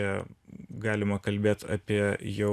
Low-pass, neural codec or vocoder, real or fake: 14.4 kHz; none; real